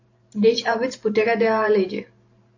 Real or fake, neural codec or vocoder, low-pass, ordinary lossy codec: fake; vocoder, 44.1 kHz, 128 mel bands every 512 samples, BigVGAN v2; 7.2 kHz; AAC, 48 kbps